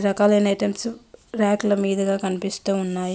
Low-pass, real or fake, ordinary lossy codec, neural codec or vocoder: none; real; none; none